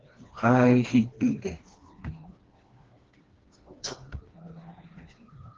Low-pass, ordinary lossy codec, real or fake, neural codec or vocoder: 7.2 kHz; Opus, 16 kbps; fake; codec, 16 kHz, 2 kbps, FreqCodec, smaller model